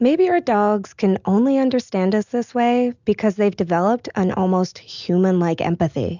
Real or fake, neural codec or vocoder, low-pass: real; none; 7.2 kHz